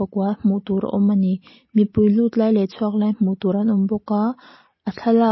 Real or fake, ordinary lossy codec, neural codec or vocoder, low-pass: real; MP3, 24 kbps; none; 7.2 kHz